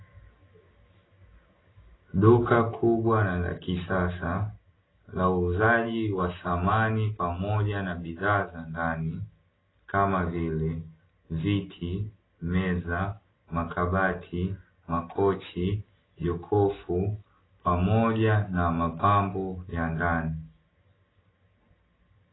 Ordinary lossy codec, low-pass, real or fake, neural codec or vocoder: AAC, 16 kbps; 7.2 kHz; real; none